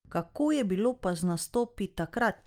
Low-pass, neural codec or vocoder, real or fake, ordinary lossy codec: 19.8 kHz; none; real; Opus, 32 kbps